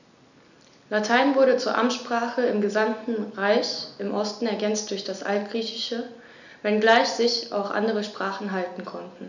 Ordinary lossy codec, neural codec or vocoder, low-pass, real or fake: none; none; 7.2 kHz; real